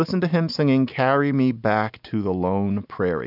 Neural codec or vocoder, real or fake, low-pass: none; real; 5.4 kHz